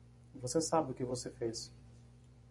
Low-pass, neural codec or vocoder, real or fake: 10.8 kHz; vocoder, 24 kHz, 100 mel bands, Vocos; fake